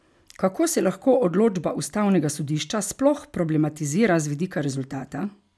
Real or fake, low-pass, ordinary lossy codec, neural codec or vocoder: real; none; none; none